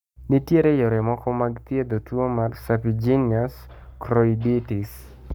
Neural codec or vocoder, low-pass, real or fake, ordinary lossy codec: codec, 44.1 kHz, 7.8 kbps, Pupu-Codec; none; fake; none